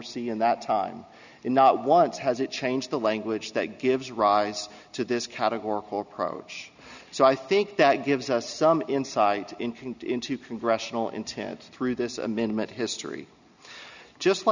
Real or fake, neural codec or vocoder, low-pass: real; none; 7.2 kHz